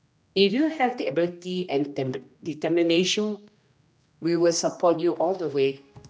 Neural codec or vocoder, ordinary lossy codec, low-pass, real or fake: codec, 16 kHz, 1 kbps, X-Codec, HuBERT features, trained on general audio; none; none; fake